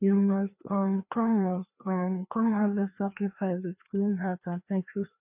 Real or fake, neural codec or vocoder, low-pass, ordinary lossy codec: fake; codec, 16 kHz, 2 kbps, FreqCodec, larger model; 3.6 kHz; none